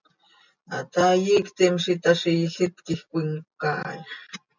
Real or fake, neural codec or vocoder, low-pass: real; none; 7.2 kHz